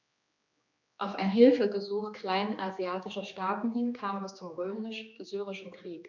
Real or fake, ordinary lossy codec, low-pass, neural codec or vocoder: fake; none; 7.2 kHz; codec, 16 kHz, 2 kbps, X-Codec, HuBERT features, trained on general audio